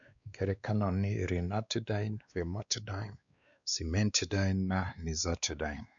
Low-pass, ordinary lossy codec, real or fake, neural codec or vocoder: 7.2 kHz; none; fake; codec, 16 kHz, 2 kbps, X-Codec, WavLM features, trained on Multilingual LibriSpeech